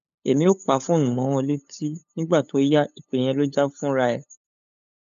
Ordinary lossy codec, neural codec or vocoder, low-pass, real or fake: none; codec, 16 kHz, 8 kbps, FunCodec, trained on LibriTTS, 25 frames a second; 7.2 kHz; fake